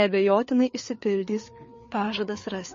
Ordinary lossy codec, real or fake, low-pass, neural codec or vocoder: MP3, 32 kbps; fake; 7.2 kHz; codec, 16 kHz, 4 kbps, FreqCodec, larger model